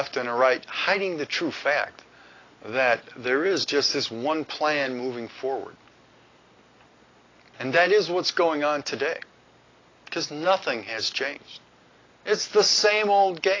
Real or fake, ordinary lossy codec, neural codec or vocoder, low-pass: real; AAC, 32 kbps; none; 7.2 kHz